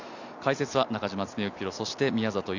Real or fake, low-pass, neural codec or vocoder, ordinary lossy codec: real; 7.2 kHz; none; none